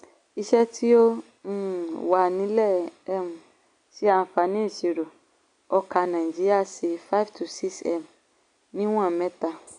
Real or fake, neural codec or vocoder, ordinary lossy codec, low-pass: real; none; none; 9.9 kHz